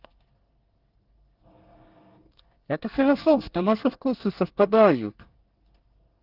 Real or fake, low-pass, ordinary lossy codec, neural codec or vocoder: fake; 5.4 kHz; Opus, 16 kbps; codec, 24 kHz, 1 kbps, SNAC